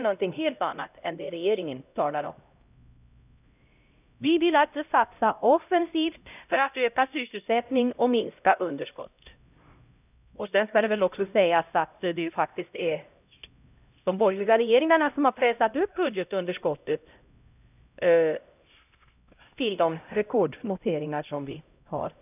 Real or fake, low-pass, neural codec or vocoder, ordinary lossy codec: fake; 3.6 kHz; codec, 16 kHz, 0.5 kbps, X-Codec, HuBERT features, trained on LibriSpeech; none